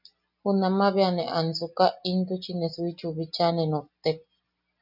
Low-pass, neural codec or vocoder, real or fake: 5.4 kHz; none; real